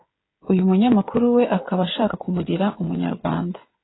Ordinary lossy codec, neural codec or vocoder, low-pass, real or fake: AAC, 16 kbps; codec, 16 kHz, 16 kbps, FreqCodec, smaller model; 7.2 kHz; fake